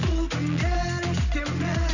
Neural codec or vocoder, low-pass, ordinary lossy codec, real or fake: none; 7.2 kHz; none; real